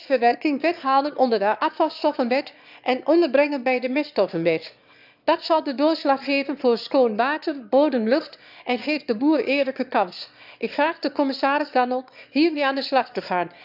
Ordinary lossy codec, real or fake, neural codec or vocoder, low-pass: none; fake; autoencoder, 22.05 kHz, a latent of 192 numbers a frame, VITS, trained on one speaker; 5.4 kHz